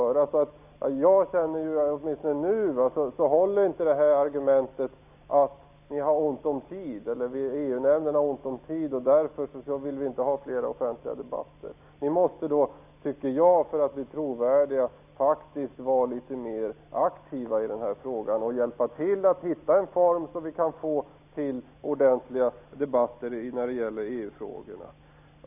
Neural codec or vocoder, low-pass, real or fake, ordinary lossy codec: none; 3.6 kHz; real; none